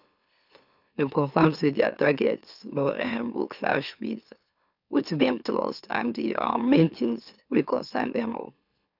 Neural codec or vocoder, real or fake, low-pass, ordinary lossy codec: autoencoder, 44.1 kHz, a latent of 192 numbers a frame, MeloTTS; fake; 5.4 kHz; none